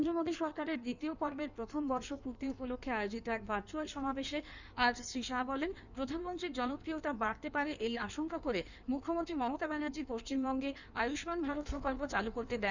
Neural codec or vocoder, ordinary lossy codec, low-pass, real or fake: codec, 16 kHz in and 24 kHz out, 1.1 kbps, FireRedTTS-2 codec; none; 7.2 kHz; fake